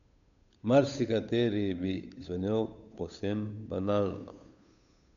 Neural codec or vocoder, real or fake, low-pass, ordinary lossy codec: codec, 16 kHz, 8 kbps, FunCodec, trained on Chinese and English, 25 frames a second; fake; 7.2 kHz; none